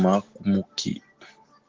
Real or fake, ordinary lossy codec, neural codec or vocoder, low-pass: real; Opus, 16 kbps; none; 7.2 kHz